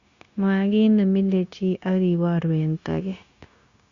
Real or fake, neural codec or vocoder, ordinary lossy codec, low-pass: fake; codec, 16 kHz, 0.9 kbps, LongCat-Audio-Codec; Opus, 64 kbps; 7.2 kHz